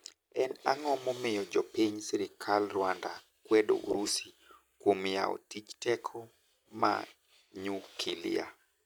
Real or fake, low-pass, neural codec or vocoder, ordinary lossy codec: real; none; none; none